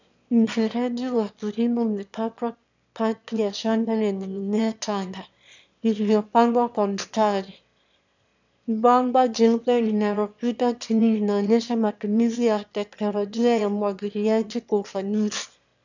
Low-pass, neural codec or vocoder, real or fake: 7.2 kHz; autoencoder, 22.05 kHz, a latent of 192 numbers a frame, VITS, trained on one speaker; fake